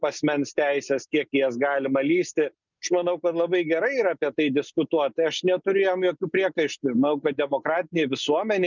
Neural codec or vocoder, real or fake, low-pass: none; real; 7.2 kHz